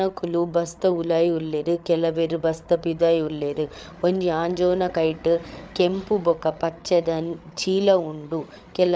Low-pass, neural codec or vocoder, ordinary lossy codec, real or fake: none; codec, 16 kHz, 8 kbps, FreqCodec, larger model; none; fake